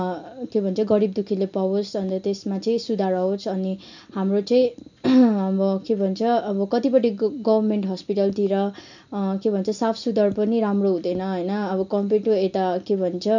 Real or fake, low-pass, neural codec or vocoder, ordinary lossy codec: real; 7.2 kHz; none; none